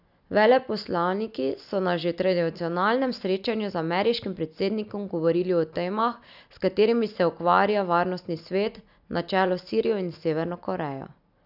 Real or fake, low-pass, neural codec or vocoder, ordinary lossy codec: real; 5.4 kHz; none; none